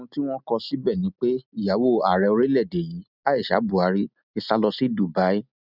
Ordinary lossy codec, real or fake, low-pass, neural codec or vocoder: none; real; 5.4 kHz; none